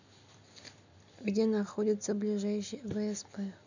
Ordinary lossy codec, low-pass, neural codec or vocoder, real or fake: none; 7.2 kHz; none; real